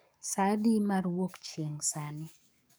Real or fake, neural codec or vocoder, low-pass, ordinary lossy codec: fake; codec, 44.1 kHz, 7.8 kbps, DAC; none; none